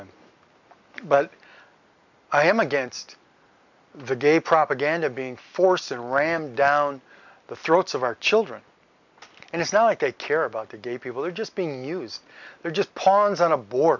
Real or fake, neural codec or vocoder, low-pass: real; none; 7.2 kHz